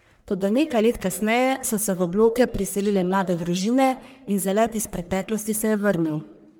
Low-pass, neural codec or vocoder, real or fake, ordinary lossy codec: none; codec, 44.1 kHz, 1.7 kbps, Pupu-Codec; fake; none